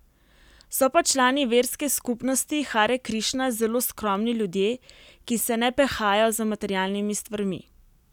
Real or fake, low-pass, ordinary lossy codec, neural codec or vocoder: real; 19.8 kHz; none; none